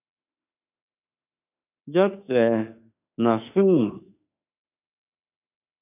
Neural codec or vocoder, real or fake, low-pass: autoencoder, 48 kHz, 32 numbers a frame, DAC-VAE, trained on Japanese speech; fake; 3.6 kHz